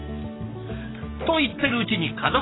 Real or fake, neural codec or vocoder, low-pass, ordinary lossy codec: fake; codec, 44.1 kHz, 7.8 kbps, DAC; 7.2 kHz; AAC, 16 kbps